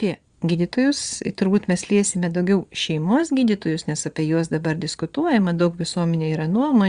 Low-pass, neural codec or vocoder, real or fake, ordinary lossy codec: 9.9 kHz; vocoder, 22.05 kHz, 80 mel bands, Vocos; fake; Opus, 64 kbps